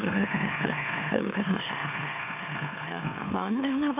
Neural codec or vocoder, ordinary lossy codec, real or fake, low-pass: autoencoder, 44.1 kHz, a latent of 192 numbers a frame, MeloTTS; MP3, 24 kbps; fake; 3.6 kHz